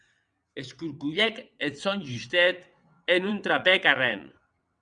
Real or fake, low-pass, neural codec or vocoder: fake; 9.9 kHz; vocoder, 22.05 kHz, 80 mel bands, WaveNeXt